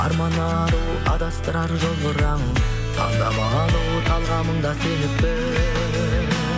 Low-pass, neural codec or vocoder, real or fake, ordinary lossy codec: none; none; real; none